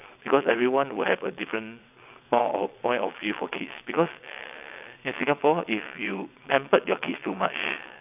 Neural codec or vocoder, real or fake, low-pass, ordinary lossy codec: vocoder, 22.05 kHz, 80 mel bands, WaveNeXt; fake; 3.6 kHz; none